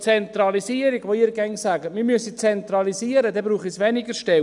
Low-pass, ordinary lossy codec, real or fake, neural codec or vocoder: 10.8 kHz; none; real; none